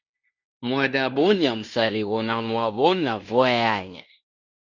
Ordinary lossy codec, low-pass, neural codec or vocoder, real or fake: Opus, 64 kbps; 7.2 kHz; codec, 16 kHz in and 24 kHz out, 0.9 kbps, LongCat-Audio-Codec, fine tuned four codebook decoder; fake